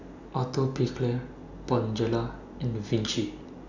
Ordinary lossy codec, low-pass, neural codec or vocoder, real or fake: none; 7.2 kHz; none; real